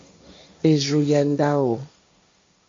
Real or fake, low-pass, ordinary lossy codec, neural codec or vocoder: fake; 7.2 kHz; MP3, 48 kbps; codec, 16 kHz, 1.1 kbps, Voila-Tokenizer